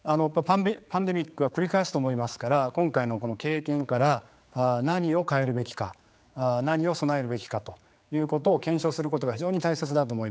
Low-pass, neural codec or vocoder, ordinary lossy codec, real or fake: none; codec, 16 kHz, 4 kbps, X-Codec, HuBERT features, trained on general audio; none; fake